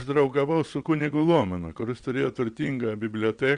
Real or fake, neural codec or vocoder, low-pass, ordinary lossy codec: fake; vocoder, 22.05 kHz, 80 mel bands, WaveNeXt; 9.9 kHz; Opus, 64 kbps